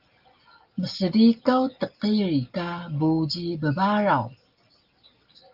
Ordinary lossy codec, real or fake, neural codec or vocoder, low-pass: Opus, 32 kbps; real; none; 5.4 kHz